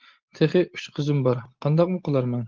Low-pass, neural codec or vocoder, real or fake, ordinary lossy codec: 7.2 kHz; none; real; Opus, 16 kbps